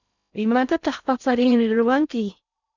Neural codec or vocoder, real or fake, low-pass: codec, 16 kHz in and 24 kHz out, 0.6 kbps, FocalCodec, streaming, 2048 codes; fake; 7.2 kHz